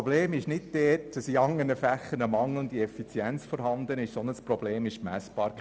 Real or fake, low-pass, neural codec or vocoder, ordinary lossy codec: real; none; none; none